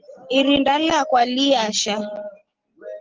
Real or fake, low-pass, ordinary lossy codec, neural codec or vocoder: real; 7.2 kHz; Opus, 16 kbps; none